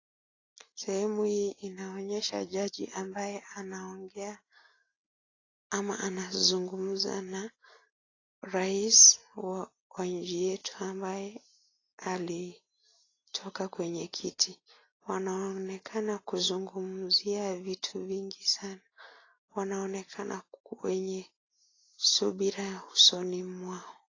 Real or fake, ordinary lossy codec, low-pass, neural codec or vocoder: real; AAC, 32 kbps; 7.2 kHz; none